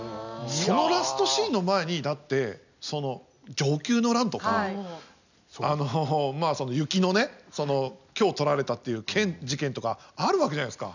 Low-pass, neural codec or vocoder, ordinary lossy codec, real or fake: 7.2 kHz; none; none; real